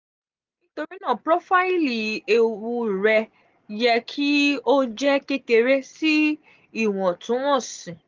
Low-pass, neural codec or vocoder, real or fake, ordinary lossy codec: 7.2 kHz; none; real; Opus, 32 kbps